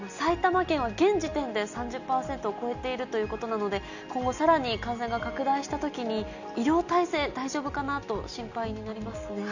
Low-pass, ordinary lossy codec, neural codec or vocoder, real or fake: 7.2 kHz; none; none; real